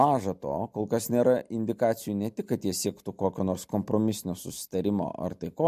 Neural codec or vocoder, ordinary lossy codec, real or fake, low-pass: none; MP3, 64 kbps; real; 14.4 kHz